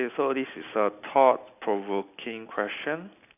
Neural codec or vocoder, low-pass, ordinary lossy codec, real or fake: none; 3.6 kHz; none; real